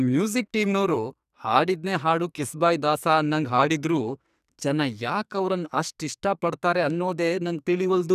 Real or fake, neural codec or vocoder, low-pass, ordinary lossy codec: fake; codec, 44.1 kHz, 2.6 kbps, SNAC; 14.4 kHz; none